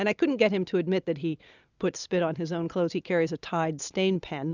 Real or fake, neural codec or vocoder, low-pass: real; none; 7.2 kHz